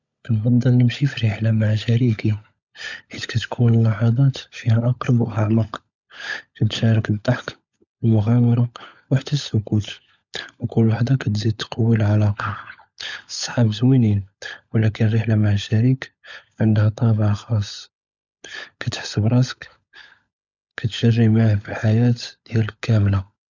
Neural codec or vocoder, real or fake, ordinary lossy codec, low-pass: codec, 16 kHz, 16 kbps, FunCodec, trained on LibriTTS, 50 frames a second; fake; none; 7.2 kHz